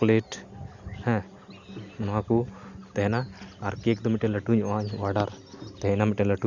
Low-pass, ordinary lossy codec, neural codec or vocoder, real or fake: 7.2 kHz; Opus, 64 kbps; none; real